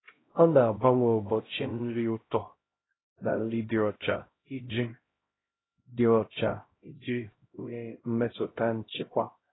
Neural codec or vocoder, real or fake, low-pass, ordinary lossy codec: codec, 16 kHz, 0.5 kbps, X-Codec, HuBERT features, trained on LibriSpeech; fake; 7.2 kHz; AAC, 16 kbps